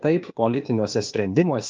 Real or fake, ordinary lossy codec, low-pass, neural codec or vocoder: fake; Opus, 24 kbps; 7.2 kHz; codec, 16 kHz, 0.8 kbps, ZipCodec